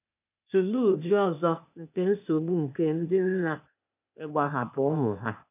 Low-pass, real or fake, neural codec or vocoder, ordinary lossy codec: 3.6 kHz; fake; codec, 16 kHz, 0.8 kbps, ZipCodec; none